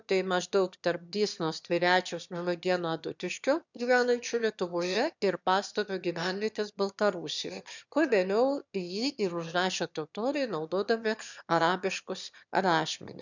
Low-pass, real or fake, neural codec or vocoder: 7.2 kHz; fake; autoencoder, 22.05 kHz, a latent of 192 numbers a frame, VITS, trained on one speaker